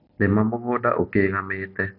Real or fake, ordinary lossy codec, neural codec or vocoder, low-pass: real; none; none; 5.4 kHz